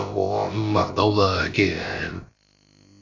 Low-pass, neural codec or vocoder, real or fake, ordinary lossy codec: 7.2 kHz; codec, 16 kHz, about 1 kbps, DyCAST, with the encoder's durations; fake; AAC, 32 kbps